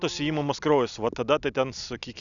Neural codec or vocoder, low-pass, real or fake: none; 7.2 kHz; real